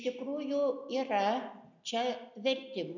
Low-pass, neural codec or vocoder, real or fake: 7.2 kHz; none; real